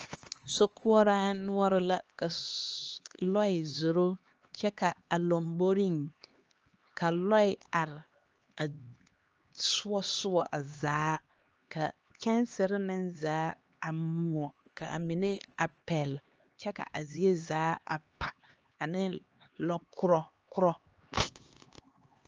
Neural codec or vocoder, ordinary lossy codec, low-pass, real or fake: codec, 16 kHz, 2 kbps, X-Codec, HuBERT features, trained on LibriSpeech; Opus, 24 kbps; 7.2 kHz; fake